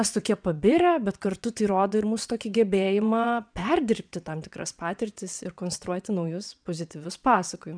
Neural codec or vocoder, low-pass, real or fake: vocoder, 22.05 kHz, 80 mel bands, WaveNeXt; 9.9 kHz; fake